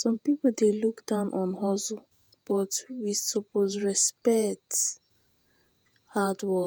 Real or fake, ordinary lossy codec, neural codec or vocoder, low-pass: fake; none; vocoder, 48 kHz, 128 mel bands, Vocos; none